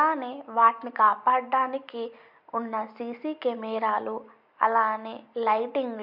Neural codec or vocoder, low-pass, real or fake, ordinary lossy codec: none; 5.4 kHz; real; MP3, 48 kbps